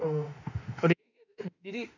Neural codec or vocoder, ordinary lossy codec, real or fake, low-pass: autoencoder, 48 kHz, 32 numbers a frame, DAC-VAE, trained on Japanese speech; none; fake; 7.2 kHz